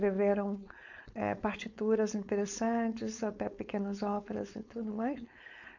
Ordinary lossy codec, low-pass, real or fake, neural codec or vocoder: none; 7.2 kHz; fake; codec, 16 kHz, 4.8 kbps, FACodec